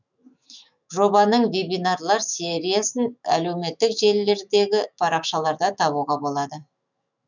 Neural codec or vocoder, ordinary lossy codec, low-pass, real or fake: autoencoder, 48 kHz, 128 numbers a frame, DAC-VAE, trained on Japanese speech; none; 7.2 kHz; fake